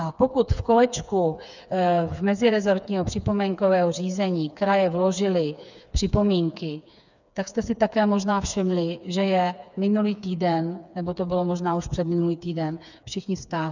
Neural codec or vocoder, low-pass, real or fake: codec, 16 kHz, 4 kbps, FreqCodec, smaller model; 7.2 kHz; fake